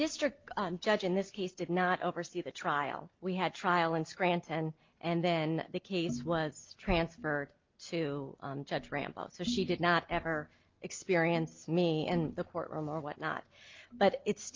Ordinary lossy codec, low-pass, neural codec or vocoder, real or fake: Opus, 24 kbps; 7.2 kHz; none; real